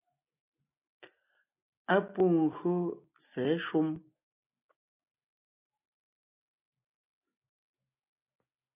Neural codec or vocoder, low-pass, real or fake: none; 3.6 kHz; real